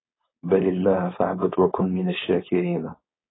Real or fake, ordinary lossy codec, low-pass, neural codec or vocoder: fake; AAC, 16 kbps; 7.2 kHz; codec, 16 kHz, 4.8 kbps, FACodec